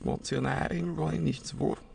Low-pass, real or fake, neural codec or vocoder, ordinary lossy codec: 9.9 kHz; fake; autoencoder, 22.05 kHz, a latent of 192 numbers a frame, VITS, trained on many speakers; none